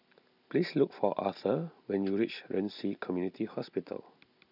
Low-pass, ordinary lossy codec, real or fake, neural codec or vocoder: 5.4 kHz; none; real; none